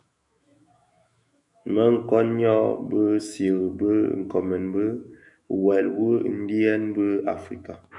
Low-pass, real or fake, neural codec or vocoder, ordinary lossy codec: 10.8 kHz; fake; autoencoder, 48 kHz, 128 numbers a frame, DAC-VAE, trained on Japanese speech; MP3, 96 kbps